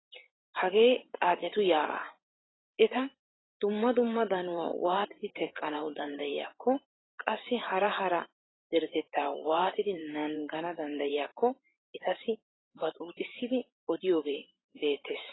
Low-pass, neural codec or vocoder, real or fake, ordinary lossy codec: 7.2 kHz; vocoder, 44.1 kHz, 128 mel bands every 512 samples, BigVGAN v2; fake; AAC, 16 kbps